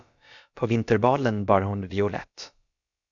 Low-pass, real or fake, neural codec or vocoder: 7.2 kHz; fake; codec, 16 kHz, about 1 kbps, DyCAST, with the encoder's durations